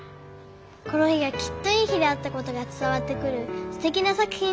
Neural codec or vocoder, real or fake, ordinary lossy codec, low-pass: none; real; none; none